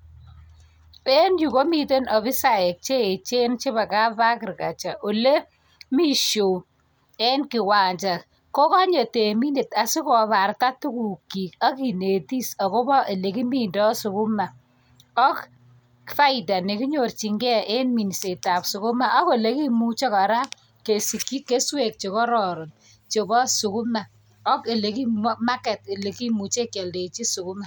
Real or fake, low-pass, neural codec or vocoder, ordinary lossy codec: real; none; none; none